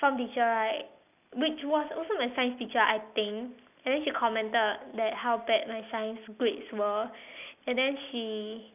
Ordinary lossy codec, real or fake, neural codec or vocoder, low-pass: none; real; none; 3.6 kHz